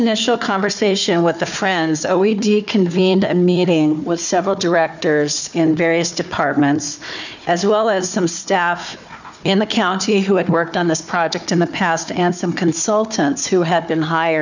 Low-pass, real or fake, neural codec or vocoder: 7.2 kHz; fake; codec, 16 kHz, 4 kbps, FunCodec, trained on Chinese and English, 50 frames a second